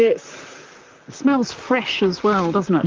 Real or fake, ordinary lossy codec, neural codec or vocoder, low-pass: fake; Opus, 32 kbps; vocoder, 44.1 kHz, 128 mel bands, Pupu-Vocoder; 7.2 kHz